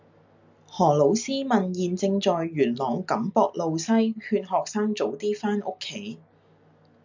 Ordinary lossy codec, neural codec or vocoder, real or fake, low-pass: MP3, 64 kbps; none; real; 7.2 kHz